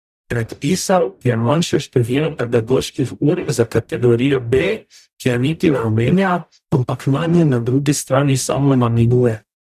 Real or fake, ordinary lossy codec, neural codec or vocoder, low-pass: fake; none; codec, 44.1 kHz, 0.9 kbps, DAC; 14.4 kHz